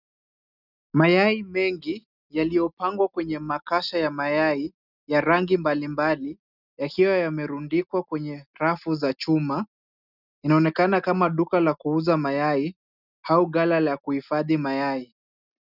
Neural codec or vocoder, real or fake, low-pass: none; real; 5.4 kHz